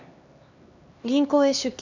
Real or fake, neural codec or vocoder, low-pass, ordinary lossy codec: fake; codec, 16 kHz, 2 kbps, X-Codec, HuBERT features, trained on LibriSpeech; 7.2 kHz; none